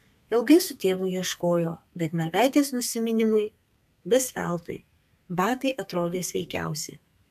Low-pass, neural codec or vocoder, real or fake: 14.4 kHz; codec, 32 kHz, 1.9 kbps, SNAC; fake